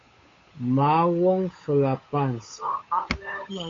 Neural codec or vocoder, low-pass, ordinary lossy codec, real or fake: codec, 16 kHz, 8 kbps, FunCodec, trained on Chinese and English, 25 frames a second; 7.2 kHz; AAC, 32 kbps; fake